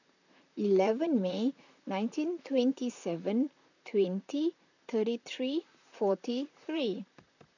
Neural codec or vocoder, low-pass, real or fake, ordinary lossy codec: vocoder, 44.1 kHz, 128 mel bands, Pupu-Vocoder; 7.2 kHz; fake; none